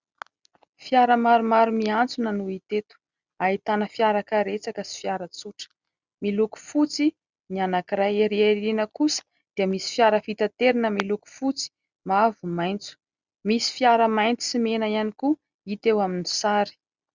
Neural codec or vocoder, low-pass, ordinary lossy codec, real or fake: none; 7.2 kHz; Opus, 64 kbps; real